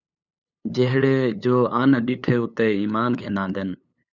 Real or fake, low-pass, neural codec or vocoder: fake; 7.2 kHz; codec, 16 kHz, 8 kbps, FunCodec, trained on LibriTTS, 25 frames a second